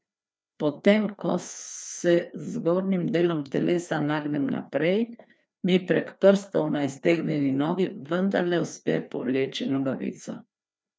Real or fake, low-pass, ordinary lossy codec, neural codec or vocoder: fake; none; none; codec, 16 kHz, 2 kbps, FreqCodec, larger model